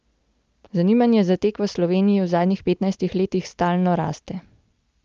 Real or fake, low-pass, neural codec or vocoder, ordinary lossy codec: real; 7.2 kHz; none; Opus, 24 kbps